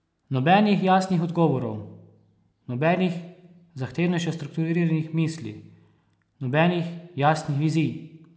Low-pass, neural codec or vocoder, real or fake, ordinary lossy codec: none; none; real; none